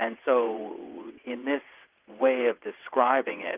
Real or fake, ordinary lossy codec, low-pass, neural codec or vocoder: fake; Opus, 24 kbps; 3.6 kHz; vocoder, 22.05 kHz, 80 mel bands, WaveNeXt